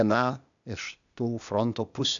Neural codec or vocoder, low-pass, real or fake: codec, 16 kHz, 0.8 kbps, ZipCodec; 7.2 kHz; fake